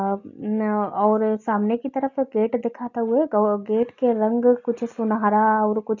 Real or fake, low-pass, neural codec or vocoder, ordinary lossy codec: real; 7.2 kHz; none; none